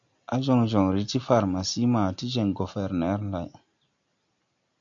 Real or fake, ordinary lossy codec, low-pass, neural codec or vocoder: real; AAC, 64 kbps; 7.2 kHz; none